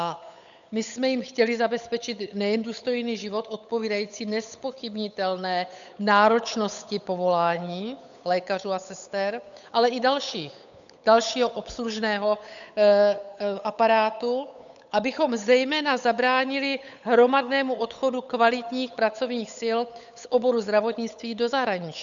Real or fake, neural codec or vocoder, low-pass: fake; codec, 16 kHz, 8 kbps, FunCodec, trained on Chinese and English, 25 frames a second; 7.2 kHz